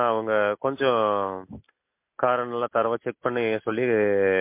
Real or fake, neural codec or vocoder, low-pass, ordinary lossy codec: real; none; 3.6 kHz; MP3, 24 kbps